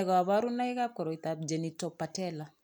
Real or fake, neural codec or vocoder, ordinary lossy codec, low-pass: real; none; none; none